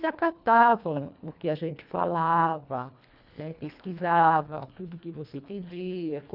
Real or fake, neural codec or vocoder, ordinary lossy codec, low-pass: fake; codec, 24 kHz, 1.5 kbps, HILCodec; none; 5.4 kHz